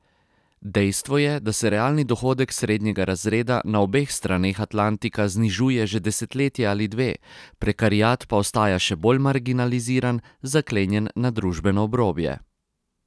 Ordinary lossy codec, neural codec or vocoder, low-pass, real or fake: none; none; none; real